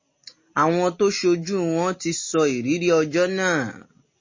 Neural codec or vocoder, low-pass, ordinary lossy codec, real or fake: none; 7.2 kHz; MP3, 32 kbps; real